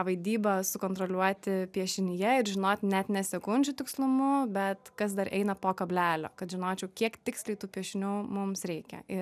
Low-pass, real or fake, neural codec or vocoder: 14.4 kHz; real; none